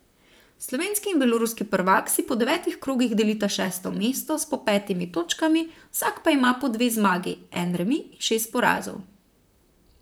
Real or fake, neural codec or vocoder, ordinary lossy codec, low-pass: fake; vocoder, 44.1 kHz, 128 mel bands, Pupu-Vocoder; none; none